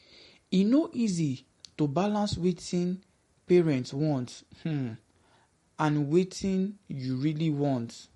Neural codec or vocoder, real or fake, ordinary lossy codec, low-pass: none; real; MP3, 48 kbps; 19.8 kHz